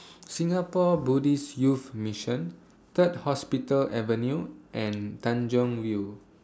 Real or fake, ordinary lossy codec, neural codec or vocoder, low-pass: real; none; none; none